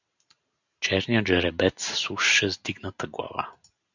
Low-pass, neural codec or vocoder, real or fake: 7.2 kHz; none; real